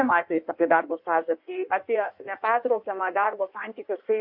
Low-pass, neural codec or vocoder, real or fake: 5.4 kHz; codec, 16 kHz in and 24 kHz out, 1.1 kbps, FireRedTTS-2 codec; fake